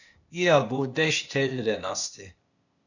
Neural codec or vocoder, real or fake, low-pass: codec, 16 kHz, 0.8 kbps, ZipCodec; fake; 7.2 kHz